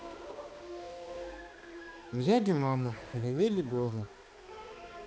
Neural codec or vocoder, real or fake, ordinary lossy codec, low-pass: codec, 16 kHz, 2 kbps, X-Codec, HuBERT features, trained on balanced general audio; fake; none; none